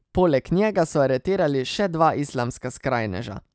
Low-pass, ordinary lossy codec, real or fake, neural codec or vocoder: none; none; real; none